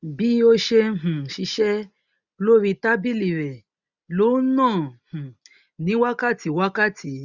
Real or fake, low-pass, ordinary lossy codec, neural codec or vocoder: real; 7.2 kHz; none; none